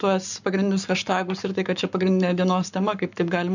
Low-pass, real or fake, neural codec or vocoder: 7.2 kHz; fake; codec, 44.1 kHz, 7.8 kbps, Pupu-Codec